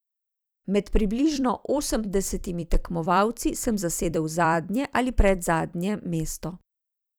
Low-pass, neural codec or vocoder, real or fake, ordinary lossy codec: none; vocoder, 44.1 kHz, 128 mel bands every 256 samples, BigVGAN v2; fake; none